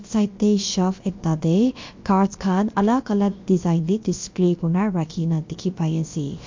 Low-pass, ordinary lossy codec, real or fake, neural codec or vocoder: 7.2 kHz; MP3, 48 kbps; fake; codec, 16 kHz, about 1 kbps, DyCAST, with the encoder's durations